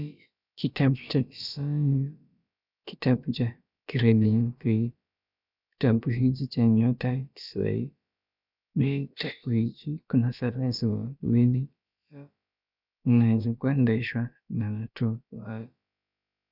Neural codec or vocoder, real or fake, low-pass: codec, 16 kHz, about 1 kbps, DyCAST, with the encoder's durations; fake; 5.4 kHz